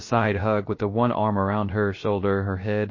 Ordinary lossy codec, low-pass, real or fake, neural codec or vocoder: MP3, 32 kbps; 7.2 kHz; fake; codec, 16 kHz, 0.3 kbps, FocalCodec